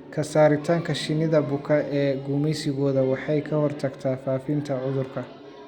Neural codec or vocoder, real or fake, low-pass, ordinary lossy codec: none; real; 19.8 kHz; Opus, 64 kbps